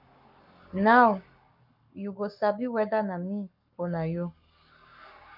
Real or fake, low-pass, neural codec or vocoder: fake; 5.4 kHz; codec, 44.1 kHz, 7.8 kbps, Pupu-Codec